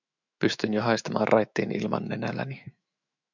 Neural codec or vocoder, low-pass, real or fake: autoencoder, 48 kHz, 128 numbers a frame, DAC-VAE, trained on Japanese speech; 7.2 kHz; fake